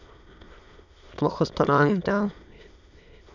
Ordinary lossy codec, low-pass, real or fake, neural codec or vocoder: none; 7.2 kHz; fake; autoencoder, 22.05 kHz, a latent of 192 numbers a frame, VITS, trained on many speakers